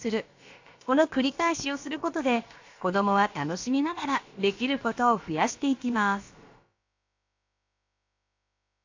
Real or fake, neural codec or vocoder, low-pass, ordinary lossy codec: fake; codec, 16 kHz, about 1 kbps, DyCAST, with the encoder's durations; 7.2 kHz; AAC, 48 kbps